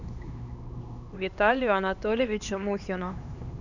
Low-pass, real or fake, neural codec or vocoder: 7.2 kHz; fake; codec, 16 kHz, 2 kbps, X-Codec, HuBERT features, trained on LibriSpeech